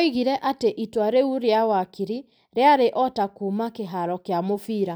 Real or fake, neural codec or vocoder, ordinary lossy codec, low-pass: real; none; none; none